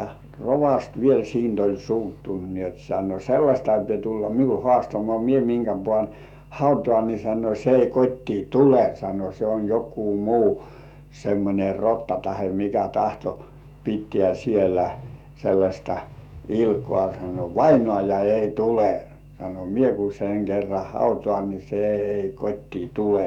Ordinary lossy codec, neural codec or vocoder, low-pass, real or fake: none; autoencoder, 48 kHz, 128 numbers a frame, DAC-VAE, trained on Japanese speech; 19.8 kHz; fake